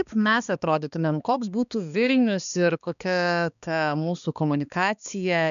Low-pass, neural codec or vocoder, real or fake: 7.2 kHz; codec, 16 kHz, 2 kbps, X-Codec, HuBERT features, trained on balanced general audio; fake